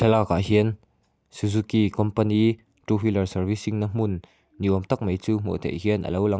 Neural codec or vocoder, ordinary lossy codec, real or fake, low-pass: none; none; real; none